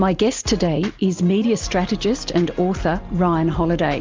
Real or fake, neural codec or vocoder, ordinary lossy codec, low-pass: real; none; Opus, 32 kbps; 7.2 kHz